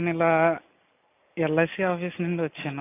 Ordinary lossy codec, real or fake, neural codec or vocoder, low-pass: AAC, 24 kbps; real; none; 3.6 kHz